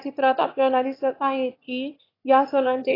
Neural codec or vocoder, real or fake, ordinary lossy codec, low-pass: autoencoder, 22.05 kHz, a latent of 192 numbers a frame, VITS, trained on one speaker; fake; AAC, 24 kbps; 5.4 kHz